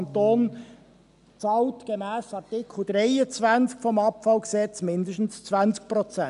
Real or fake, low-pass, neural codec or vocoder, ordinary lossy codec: real; 10.8 kHz; none; none